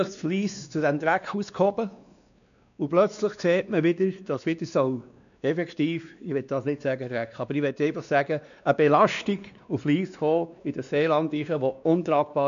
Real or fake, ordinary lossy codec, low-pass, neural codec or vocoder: fake; none; 7.2 kHz; codec, 16 kHz, 2 kbps, X-Codec, WavLM features, trained on Multilingual LibriSpeech